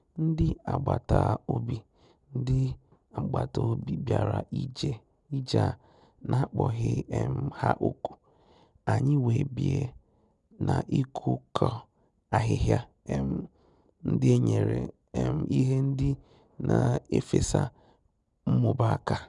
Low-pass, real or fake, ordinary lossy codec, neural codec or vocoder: 10.8 kHz; real; none; none